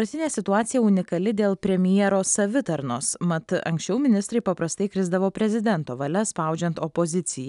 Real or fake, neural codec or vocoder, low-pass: real; none; 10.8 kHz